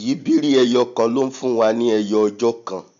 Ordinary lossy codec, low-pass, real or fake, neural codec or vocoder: MP3, 64 kbps; 7.2 kHz; real; none